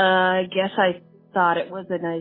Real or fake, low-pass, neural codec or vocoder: real; 5.4 kHz; none